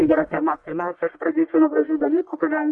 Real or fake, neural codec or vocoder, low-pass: fake; codec, 44.1 kHz, 1.7 kbps, Pupu-Codec; 10.8 kHz